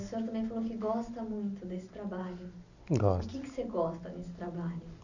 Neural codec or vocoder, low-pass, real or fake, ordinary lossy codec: none; 7.2 kHz; real; none